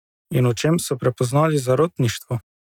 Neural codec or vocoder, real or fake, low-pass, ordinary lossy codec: none; real; 19.8 kHz; none